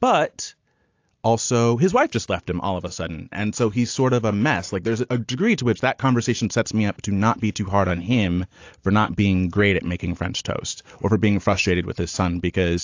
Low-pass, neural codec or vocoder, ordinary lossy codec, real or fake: 7.2 kHz; none; AAC, 48 kbps; real